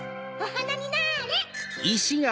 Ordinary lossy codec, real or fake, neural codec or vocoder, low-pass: none; real; none; none